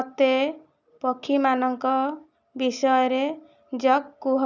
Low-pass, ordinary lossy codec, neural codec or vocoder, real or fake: 7.2 kHz; none; none; real